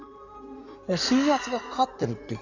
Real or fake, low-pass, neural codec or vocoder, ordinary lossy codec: fake; 7.2 kHz; codec, 16 kHz in and 24 kHz out, 2.2 kbps, FireRedTTS-2 codec; none